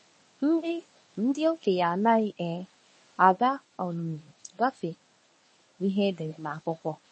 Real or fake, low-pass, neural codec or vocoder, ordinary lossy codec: fake; 10.8 kHz; codec, 24 kHz, 0.9 kbps, WavTokenizer, medium speech release version 1; MP3, 32 kbps